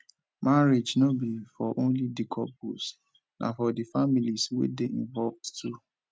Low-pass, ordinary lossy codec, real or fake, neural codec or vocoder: none; none; real; none